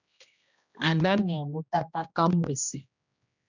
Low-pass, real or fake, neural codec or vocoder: 7.2 kHz; fake; codec, 16 kHz, 1 kbps, X-Codec, HuBERT features, trained on general audio